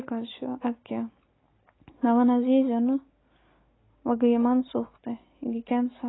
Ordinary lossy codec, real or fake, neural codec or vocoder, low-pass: AAC, 16 kbps; real; none; 7.2 kHz